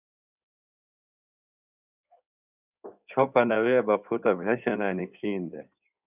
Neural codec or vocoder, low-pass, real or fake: codec, 16 kHz in and 24 kHz out, 2.2 kbps, FireRedTTS-2 codec; 3.6 kHz; fake